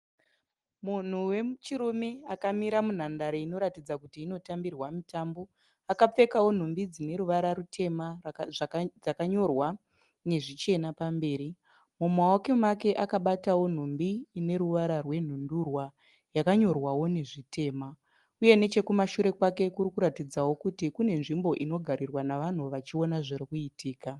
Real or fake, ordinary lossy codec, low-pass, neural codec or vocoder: real; Opus, 24 kbps; 10.8 kHz; none